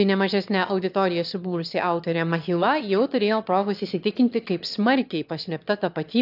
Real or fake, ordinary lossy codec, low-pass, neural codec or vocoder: fake; AAC, 48 kbps; 5.4 kHz; autoencoder, 22.05 kHz, a latent of 192 numbers a frame, VITS, trained on one speaker